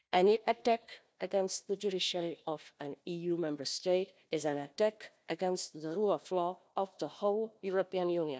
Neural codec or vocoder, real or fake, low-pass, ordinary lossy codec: codec, 16 kHz, 1 kbps, FunCodec, trained on Chinese and English, 50 frames a second; fake; none; none